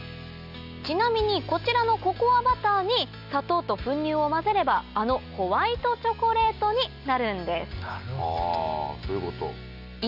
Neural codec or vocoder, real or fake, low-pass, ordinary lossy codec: none; real; 5.4 kHz; none